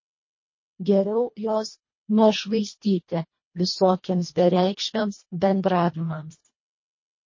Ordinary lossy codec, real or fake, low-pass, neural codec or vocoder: MP3, 32 kbps; fake; 7.2 kHz; codec, 24 kHz, 1.5 kbps, HILCodec